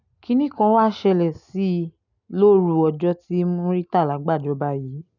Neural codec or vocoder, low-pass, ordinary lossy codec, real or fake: none; 7.2 kHz; none; real